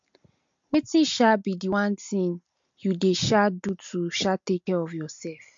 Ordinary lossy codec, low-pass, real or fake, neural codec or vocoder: MP3, 48 kbps; 7.2 kHz; real; none